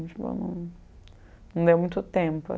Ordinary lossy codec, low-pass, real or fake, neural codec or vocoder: none; none; real; none